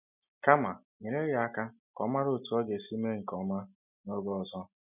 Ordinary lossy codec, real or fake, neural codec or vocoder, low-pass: none; real; none; 3.6 kHz